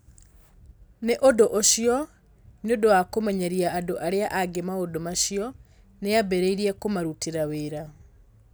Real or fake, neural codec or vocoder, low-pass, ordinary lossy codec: real; none; none; none